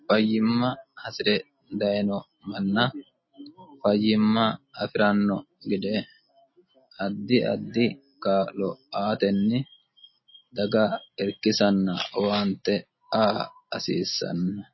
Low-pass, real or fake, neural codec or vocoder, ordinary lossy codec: 7.2 kHz; real; none; MP3, 24 kbps